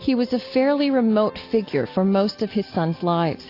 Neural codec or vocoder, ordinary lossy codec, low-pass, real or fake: none; AAC, 32 kbps; 5.4 kHz; real